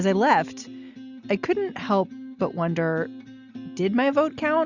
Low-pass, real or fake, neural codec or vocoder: 7.2 kHz; real; none